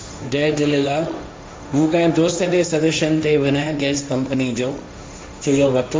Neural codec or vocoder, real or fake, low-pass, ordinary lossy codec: codec, 16 kHz, 1.1 kbps, Voila-Tokenizer; fake; none; none